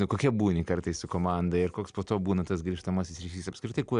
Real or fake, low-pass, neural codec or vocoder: real; 9.9 kHz; none